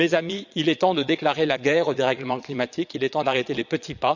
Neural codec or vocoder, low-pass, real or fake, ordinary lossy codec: vocoder, 22.05 kHz, 80 mel bands, Vocos; 7.2 kHz; fake; none